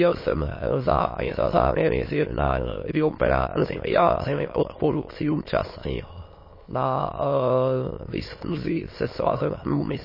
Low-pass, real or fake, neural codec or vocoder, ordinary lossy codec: 5.4 kHz; fake; autoencoder, 22.05 kHz, a latent of 192 numbers a frame, VITS, trained on many speakers; MP3, 24 kbps